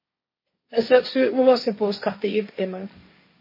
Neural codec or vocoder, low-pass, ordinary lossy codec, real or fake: codec, 16 kHz, 1.1 kbps, Voila-Tokenizer; 5.4 kHz; MP3, 24 kbps; fake